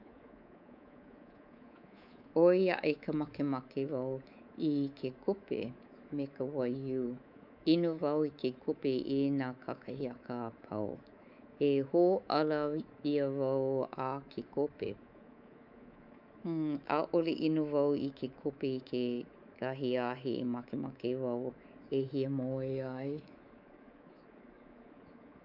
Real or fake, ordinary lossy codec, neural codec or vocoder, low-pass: fake; none; codec, 24 kHz, 3.1 kbps, DualCodec; 5.4 kHz